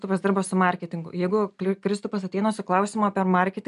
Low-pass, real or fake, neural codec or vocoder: 10.8 kHz; real; none